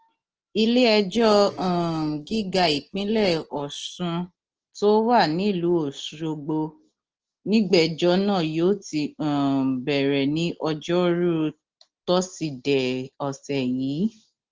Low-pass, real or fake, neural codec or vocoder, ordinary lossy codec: 7.2 kHz; real; none; Opus, 16 kbps